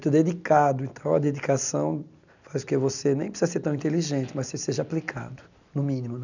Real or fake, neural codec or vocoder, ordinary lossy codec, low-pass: real; none; none; 7.2 kHz